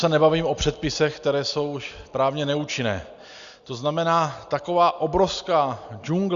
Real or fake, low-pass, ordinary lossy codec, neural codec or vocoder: real; 7.2 kHz; Opus, 64 kbps; none